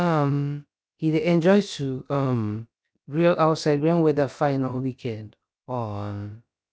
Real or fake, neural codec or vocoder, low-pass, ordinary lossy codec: fake; codec, 16 kHz, about 1 kbps, DyCAST, with the encoder's durations; none; none